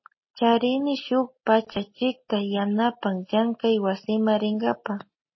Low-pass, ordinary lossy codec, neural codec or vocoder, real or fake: 7.2 kHz; MP3, 24 kbps; none; real